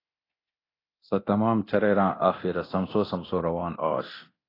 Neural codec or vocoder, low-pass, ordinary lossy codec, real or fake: codec, 24 kHz, 0.9 kbps, DualCodec; 5.4 kHz; AAC, 24 kbps; fake